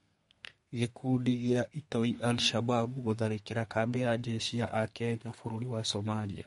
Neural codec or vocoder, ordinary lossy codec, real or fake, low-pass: codec, 32 kHz, 1.9 kbps, SNAC; MP3, 48 kbps; fake; 14.4 kHz